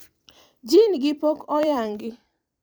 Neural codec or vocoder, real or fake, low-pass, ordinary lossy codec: vocoder, 44.1 kHz, 128 mel bands every 256 samples, BigVGAN v2; fake; none; none